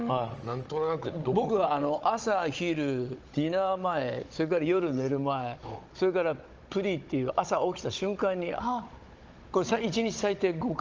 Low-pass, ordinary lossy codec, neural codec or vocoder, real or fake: 7.2 kHz; Opus, 24 kbps; codec, 16 kHz, 16 kbps, FunCodec, trained on Chinese and English, 50 frames a second; fake